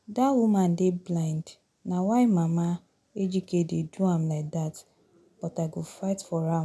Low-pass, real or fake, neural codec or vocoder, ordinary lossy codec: none; real; none; none